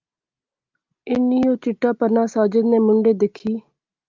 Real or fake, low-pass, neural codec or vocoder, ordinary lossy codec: real; 7.2 kHz; none; Opus, 24 kbps